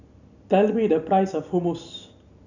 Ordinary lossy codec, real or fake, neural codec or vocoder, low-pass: none; real; none; 7.2 kHz